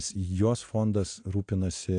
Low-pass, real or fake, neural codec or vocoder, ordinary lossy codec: 9.9 kHz; real; none; AAC, 48 kbps